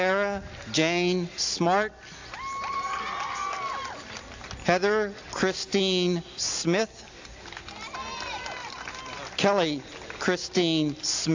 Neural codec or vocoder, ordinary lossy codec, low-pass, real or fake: none; AAC, 48 kbps; 7.2 kHz; real